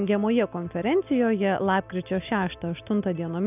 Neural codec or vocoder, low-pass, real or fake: none; 3.6 kHz; real